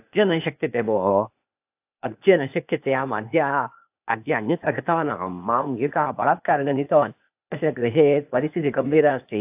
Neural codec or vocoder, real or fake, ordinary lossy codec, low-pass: codec, 16 kHz, 0.8 kbps, ZipCodec; fake; AAC, 32 kbps; 3.6 kHz